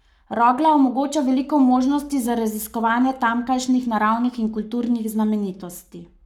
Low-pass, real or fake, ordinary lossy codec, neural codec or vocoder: 19.8 kHz; fake; none; codec, 44.1 kHz, 7.8 kbps, Pupu-Codec